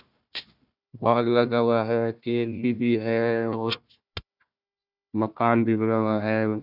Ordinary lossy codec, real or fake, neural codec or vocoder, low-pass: none; fake; codec, 16 kHz, 1 kbps, FunCodec, trained on Chinese and English, 50 frames a second; 5.4 kHz